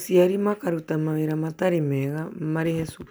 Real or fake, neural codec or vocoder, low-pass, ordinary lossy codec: real; none; none; none